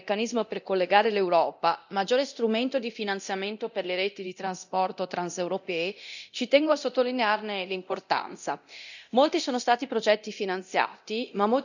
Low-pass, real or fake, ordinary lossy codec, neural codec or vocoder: 7.2 kHz; fake; none; codec, 24 kHz, 0.9 kbps, DualCodec